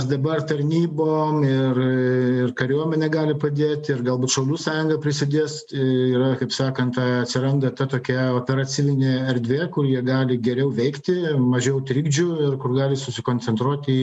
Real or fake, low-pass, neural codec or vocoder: real; 10.8 kHz; none